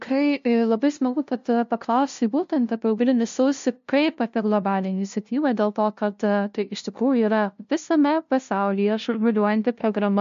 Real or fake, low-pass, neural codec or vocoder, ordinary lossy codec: fake; 7.2 kHz; codec, 16 kHz, 0.5 kbps, FunCodec, trained on LibriTTS, 25 frames a second; MP3, 48 kbps